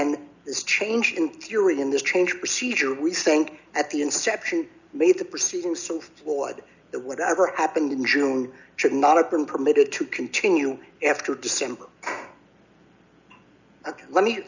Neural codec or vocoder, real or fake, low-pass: none; real; 7.2 kHz